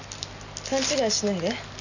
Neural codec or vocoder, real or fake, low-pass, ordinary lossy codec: none; real; 7.2 kHz; none